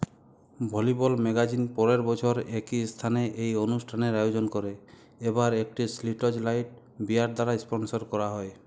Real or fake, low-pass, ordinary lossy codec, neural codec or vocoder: real; none; none; none